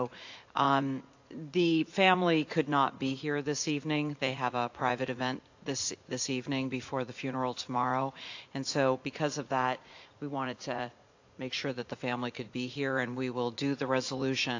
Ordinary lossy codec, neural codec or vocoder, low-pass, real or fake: AAC, 48 kbps; vocoder, 44.1 kHz, 80 mel bands, Vocos; 7.2 kHz; fake